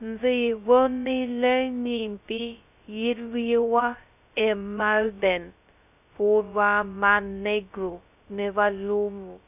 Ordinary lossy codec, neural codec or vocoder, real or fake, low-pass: none; codec, 16 kHz, 0.2 kbps, FocalCodec; fake; 3.6 kHz